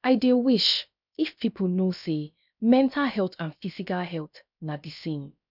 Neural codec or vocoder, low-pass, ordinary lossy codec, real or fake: codec, 16 kHz, about 1 kbps, DyCAST, with the encoder's durations; 5.4 kHz; none; fake